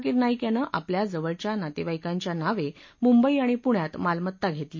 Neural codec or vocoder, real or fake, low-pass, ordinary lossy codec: none; real; 7.2 kHz; MP3, 32 kbps